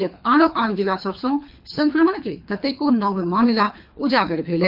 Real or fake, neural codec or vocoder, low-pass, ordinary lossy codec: fake; codec, 24 kHz, 3 kbps, HILCodec; 5.4 kHz; MP3, 48 kbps